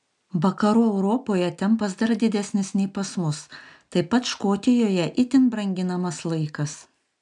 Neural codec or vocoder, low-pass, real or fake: none; 10.8 kHz; real